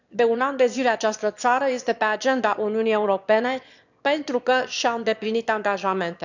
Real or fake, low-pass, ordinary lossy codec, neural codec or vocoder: fake; 7.2 kHz; none; autoencoder, 22.05 kHz, a latent of 192 numbers a frame, VITS, trained on one speaker